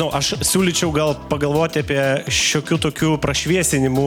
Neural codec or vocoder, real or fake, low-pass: none; real; 19.8 kHz